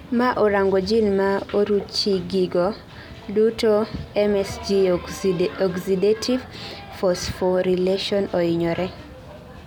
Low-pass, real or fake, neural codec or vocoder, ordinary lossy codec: 19.8 kHz; real; none; none